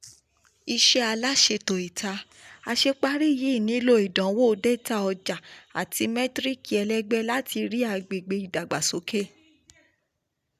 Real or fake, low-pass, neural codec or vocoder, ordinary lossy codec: real; 14.4 kHz; none; none